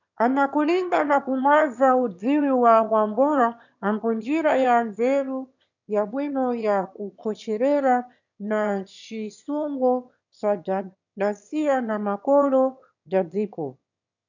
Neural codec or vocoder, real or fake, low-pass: autoencoder, 22.05 kHz, a latent of 192 numbers a frame, VITS, trained on one speaker; fake; 7.2 kHz